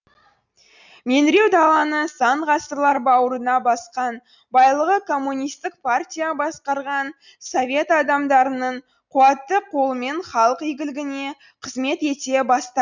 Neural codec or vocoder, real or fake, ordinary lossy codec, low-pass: none; real; none; 7.2 kHz